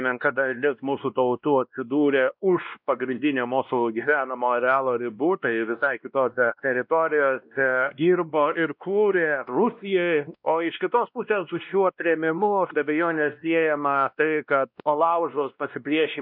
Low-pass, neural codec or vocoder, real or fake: 5.4 kHz; codec, 16 kHz, 1 kbps, X-Codec, WavLM features, trained on Multilingual LibriSpeech; fake